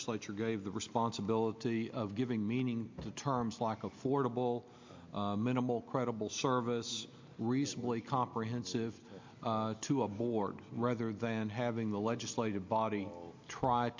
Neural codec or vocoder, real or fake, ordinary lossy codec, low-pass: none; real; MP3, 48 kbps; 7.2 kHz